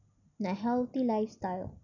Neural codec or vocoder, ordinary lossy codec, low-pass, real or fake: none; none; 7.2 kHz; real